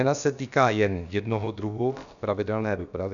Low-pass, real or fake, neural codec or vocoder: 7.2 kHz; fake; codec, 16 kHz, about 1 kbps, DyCAST, with the encoder's durations